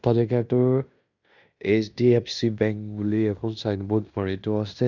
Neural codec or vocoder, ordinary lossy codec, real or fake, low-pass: codec, 16 kHz in and 24 kHz out, 0.9 kbps, LongCat-Audio-Codec, fine tuned four codebook decoder; none; fake; 7.2 kHz